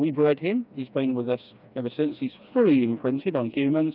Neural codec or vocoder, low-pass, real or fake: codec, 16 kHz, 2 kbps, FreqCodec, smaller model; 5.4 kHz; fake